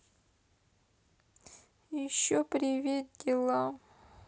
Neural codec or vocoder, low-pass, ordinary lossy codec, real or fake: none; none; none; real